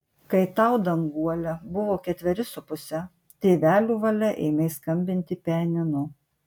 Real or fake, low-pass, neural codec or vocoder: real; 19.8 kHz; none